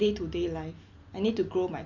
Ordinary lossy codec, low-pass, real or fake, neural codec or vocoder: Opus, 64 kbps; 7.2 kHz; real; none